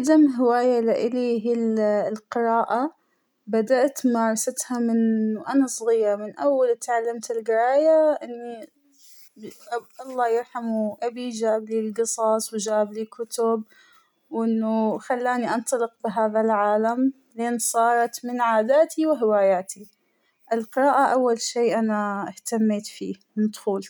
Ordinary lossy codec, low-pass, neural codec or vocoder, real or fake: none; none; none; real